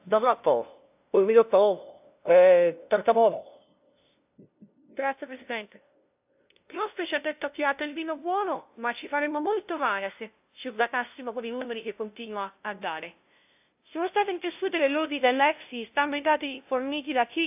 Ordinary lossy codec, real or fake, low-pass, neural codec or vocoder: AAC, 32 kbps; fake; 3.6 kHz; codec, 16 kHz, 0.5 kbps, FunCodec, trained on LibriTTS, 25 frames a second